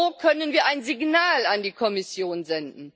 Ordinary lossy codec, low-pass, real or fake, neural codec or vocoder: none; none; real; none